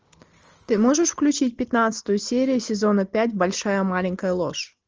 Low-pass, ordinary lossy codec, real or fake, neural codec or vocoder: 7.2 kHz; Opus, 24 kbps; real; none